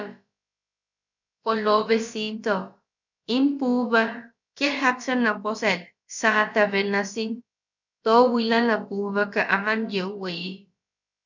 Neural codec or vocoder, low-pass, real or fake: codec, 16 kHz, about 1 kbps, DyCAST, with the encoder's durations; 7.2 kHz; fake